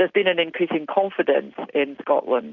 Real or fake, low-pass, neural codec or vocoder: real; 7.2 kHz; none